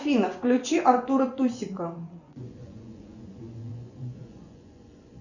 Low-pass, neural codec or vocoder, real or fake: 7.2 kHz; vocoder, 24 kHz, 100 mel bands, Vocos; fake